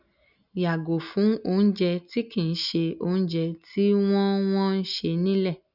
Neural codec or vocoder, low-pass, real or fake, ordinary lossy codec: none; 5.4 kHz; real; none